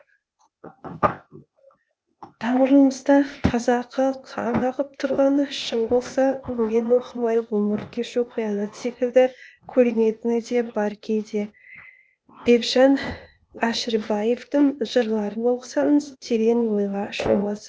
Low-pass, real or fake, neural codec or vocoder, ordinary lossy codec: none; fake; codec, 16 kHz, 0.8 kbps, ZipCodec; none